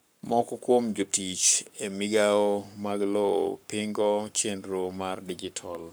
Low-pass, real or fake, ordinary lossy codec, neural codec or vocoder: none; fake; none; codec, 44.1 kHz, 7.8 kbps, Pupu-Codec